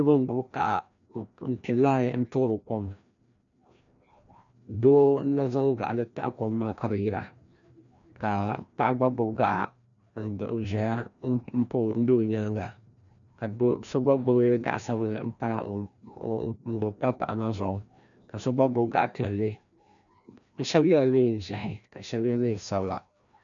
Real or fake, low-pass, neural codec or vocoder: fake; 7.2 kHz; codec, 16 kHz, 1 kbps, FreqCodec, larger model